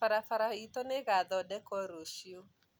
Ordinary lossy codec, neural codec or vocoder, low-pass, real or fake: none; none; none; real